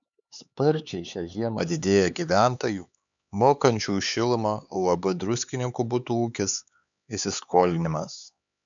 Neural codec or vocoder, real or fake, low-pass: codec, 16 kHz, 4 kbps, X-Codec, HuBERT features, trained on LibriSpeech; fake; 7.2 kHz